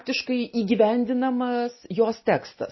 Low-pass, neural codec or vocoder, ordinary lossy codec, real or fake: 7.2 kHz; none; MP3, 24 kbps; real